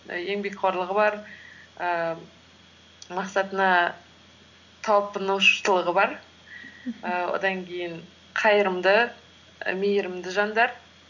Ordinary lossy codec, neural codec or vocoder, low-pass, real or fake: none; none; 7.2 kHz; real